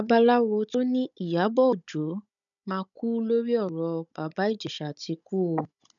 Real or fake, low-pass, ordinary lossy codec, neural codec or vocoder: fake; 7.2 kHz; none; codec, 16 kHz, 16 kbps, FunCodec, trained on Chinese and English, 50 frames a second